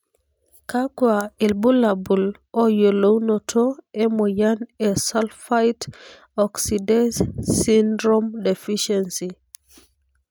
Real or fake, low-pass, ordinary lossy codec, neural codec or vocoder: real; none; none; none